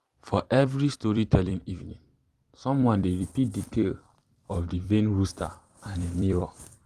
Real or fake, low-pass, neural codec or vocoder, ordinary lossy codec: fake; 14.4 kHz; vocoder, 44.1 kHz, 128 mel bands every 256 samples, BigVGAN v2; Opus, 24 kbps